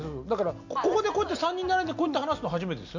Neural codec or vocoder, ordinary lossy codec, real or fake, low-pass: none; MP3, 48 kbps; real; 7.2 kHz